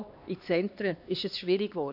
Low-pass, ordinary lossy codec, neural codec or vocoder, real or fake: 5.4 kHz; AAC, 48 kbps; codec, 16 kHz, 2 kbps, X-Codec, HuBERT features, trained on LibriSpeech; fake